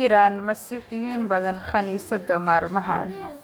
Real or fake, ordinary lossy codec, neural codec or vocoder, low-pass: fake; none; codec, 44.1 kHz, 2.6 kbps, DAC; none